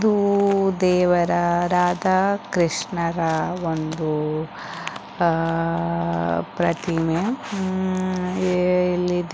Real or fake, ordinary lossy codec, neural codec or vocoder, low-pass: real; none; none; none